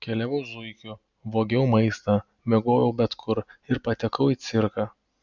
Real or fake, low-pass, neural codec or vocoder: real; 7.2 kHz; none